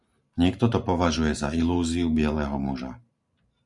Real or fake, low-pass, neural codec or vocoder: fake; 10.8 kHz; vocoder, 44.1 kHz, 128 mel bands every 512 samples, BigVGAN v2